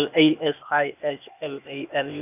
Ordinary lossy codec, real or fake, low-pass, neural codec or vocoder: none; fake; 3.6 kHz; codec, 16 kHz, 0.8 kbps, ZipCodec